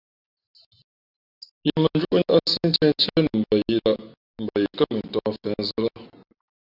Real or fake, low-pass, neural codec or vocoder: real; 5.4 kHz; none